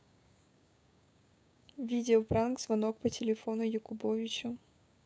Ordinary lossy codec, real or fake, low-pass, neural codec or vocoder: none; fake; none; codec, 16 kHz, 6 kbps, DAC